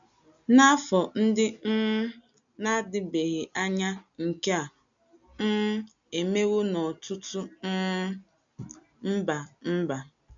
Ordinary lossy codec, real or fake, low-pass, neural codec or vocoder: none; real; 7.2 kHz; none